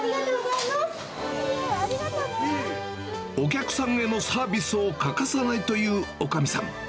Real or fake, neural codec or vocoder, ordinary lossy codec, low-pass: real; none; none; none